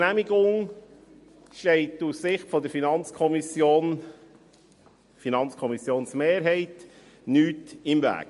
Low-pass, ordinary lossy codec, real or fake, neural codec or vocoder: 14.4 kHz; MP3, 48 kbps; real; none